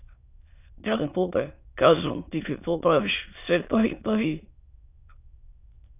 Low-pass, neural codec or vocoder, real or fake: 3.6 kHz; autoencoder, 22.05 kHz, a latent of 192 numbers a frame, VITS, trained on many speakers; fake